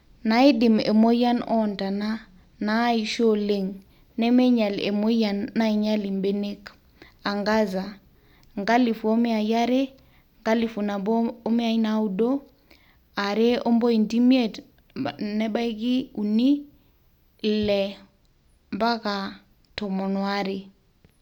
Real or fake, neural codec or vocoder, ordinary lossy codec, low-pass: real; none; none; 19.8 kHz